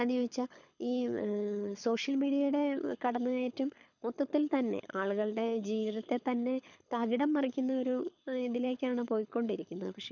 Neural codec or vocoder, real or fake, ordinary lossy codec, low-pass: codec, 24 kHz, 6 kbps, HILCodec; fake; none; 7.2 kHz